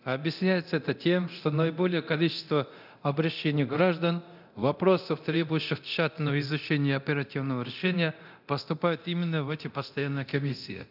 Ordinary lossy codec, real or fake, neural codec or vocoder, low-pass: none; fake; codec, 24 kHz, 0.9 kbps, DualCodec; 5.4 kHz